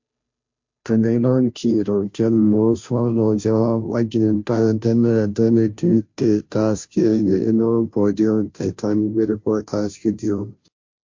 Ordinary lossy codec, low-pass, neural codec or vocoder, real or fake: MP3, 48 kbps; 7.2 kHz; codec, 16 kHz, 0.5 kbps, FunCodec, trained on Chinese and English, 25 frames a second; fake